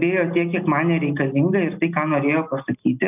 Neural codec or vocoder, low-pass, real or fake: none; 3.6 kHz; real